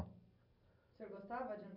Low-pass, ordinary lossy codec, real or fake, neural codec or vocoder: 5.4 kHz; none; real; none